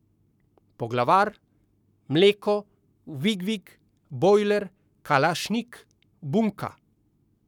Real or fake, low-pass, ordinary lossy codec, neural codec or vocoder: fake; 19.8 kHz; none; codec, 44.1 kHz, 7.8 kbps, Pupu-Codec